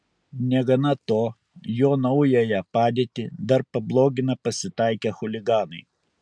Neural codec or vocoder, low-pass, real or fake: none; 9.9 kHz; real